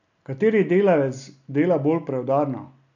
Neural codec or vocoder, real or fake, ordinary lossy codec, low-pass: none; real; none; 7.2 kHz